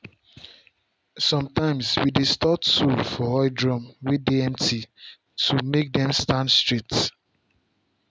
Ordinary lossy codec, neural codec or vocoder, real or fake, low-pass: none; none; real; none